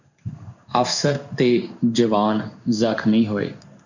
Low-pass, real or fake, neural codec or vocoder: 7.2 kHz; fake; codec, 16 kHz in and 24 kHz out, 1 kbps, XY-Tokenizer